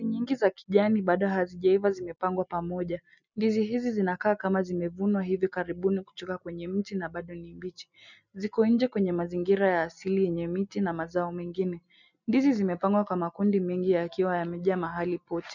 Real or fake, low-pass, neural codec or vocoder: real; 7.2 kHz; none